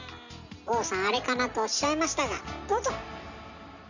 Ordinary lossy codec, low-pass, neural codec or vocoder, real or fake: none; 7.2 kHz; none; real